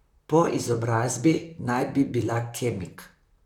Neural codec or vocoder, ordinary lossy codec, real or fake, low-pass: vocoder, 44.1 kHz, 128 mel bands, Pupu-Vocoder; none; fake; 19.8 kHz